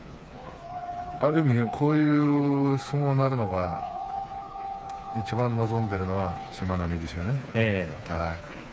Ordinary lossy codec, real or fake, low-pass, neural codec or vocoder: none; fake; none; codec, 16 kHz, 4 kbps, FreqCodec, smaller model